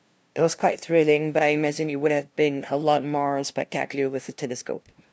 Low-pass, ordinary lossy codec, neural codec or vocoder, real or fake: none; none; codec, 16 kHz, 0.5 kbps, FunCodec, trained on LibriTTS, 25 frames a second; fake